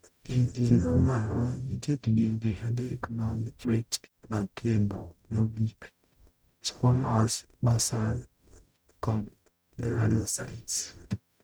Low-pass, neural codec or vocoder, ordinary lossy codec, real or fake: none; codec, 44.1 kHz, 0.9 kbps, DAC; none; fake